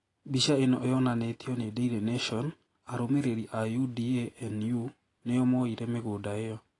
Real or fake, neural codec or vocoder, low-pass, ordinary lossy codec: real; none; 10.8 kHz; AAC, 32 kbps